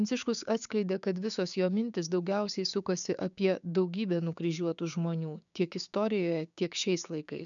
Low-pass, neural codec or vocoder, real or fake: 7.2 kHz; codec, 16 kHz, 6 kbps, DAC; fake